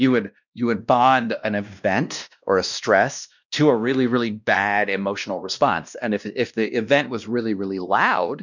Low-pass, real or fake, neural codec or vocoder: 7.2 kHz; fake; codec, 16 kHz, 1 kbps, X-Codec, WavLM features, trained on Multilingual LibriSpeech